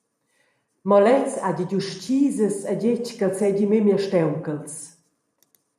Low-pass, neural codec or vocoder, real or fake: 14.4 kHz; vocoder, 44.1 kHz, 128 mel bands every 512 samples, BigVGAN v2; fake